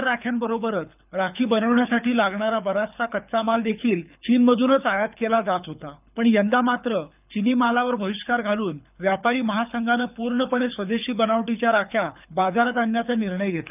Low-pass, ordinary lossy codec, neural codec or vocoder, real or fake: 3.6 kHz; none; codec, 24 kHz, 6 kbps, HILCodec; fake